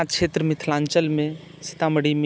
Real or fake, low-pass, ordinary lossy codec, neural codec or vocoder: real; none; none; none